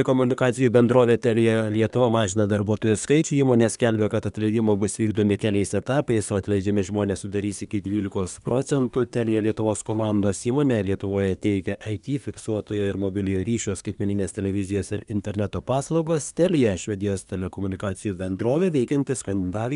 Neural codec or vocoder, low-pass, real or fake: codec, 24 kHz, 1 kbps, SNAC; 10.8 kHz; fake